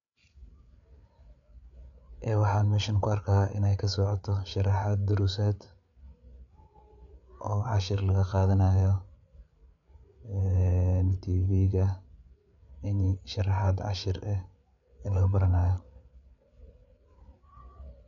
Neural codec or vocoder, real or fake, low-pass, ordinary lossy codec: codec, 16 kHz, 8 kbps, FreqCodec, larger model; fake; 7.2 kHz; none